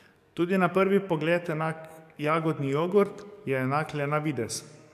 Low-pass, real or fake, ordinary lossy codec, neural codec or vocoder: 14.4 kHz; fake; none; codec, 44.1 kHz, 7.8 kbps, DAC